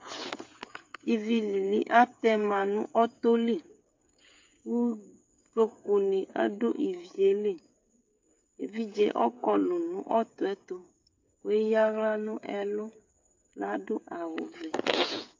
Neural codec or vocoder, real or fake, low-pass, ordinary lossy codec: codec, 16 kHz, 8 kbps, FreqCodec, smaller model; fake; 7.2 kHz; MP3, 48 kbps